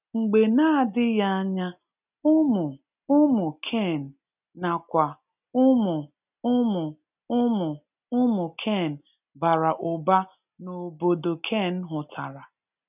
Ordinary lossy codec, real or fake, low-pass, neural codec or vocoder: none; real; 3.6 kHz; none